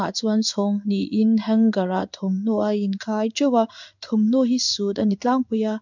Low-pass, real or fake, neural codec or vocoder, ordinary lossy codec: 7.2 kHz; fake; codec, 16 kHz in and 24 kHz out, 1 kbps, XY-Tokenizer; none